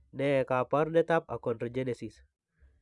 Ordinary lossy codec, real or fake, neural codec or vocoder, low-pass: none; fake; vocoder, 44.1 kHz, 128 mel bands every 512 samples, BigVGAN v2; 10.8 kHz